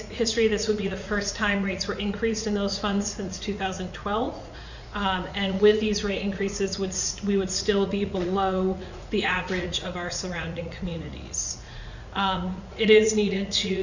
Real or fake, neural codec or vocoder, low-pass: fake; vocoder, 44.1 kHz, 80 mel bands, Vocos; 7.2 kHz